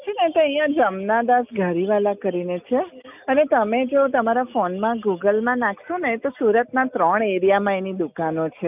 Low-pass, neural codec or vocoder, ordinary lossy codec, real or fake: 3.6 kHz; none; none; real